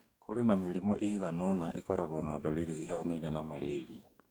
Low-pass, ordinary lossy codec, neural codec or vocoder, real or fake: none; none; codec, 44.1 kHz, 2.6 kbps, DAC; fake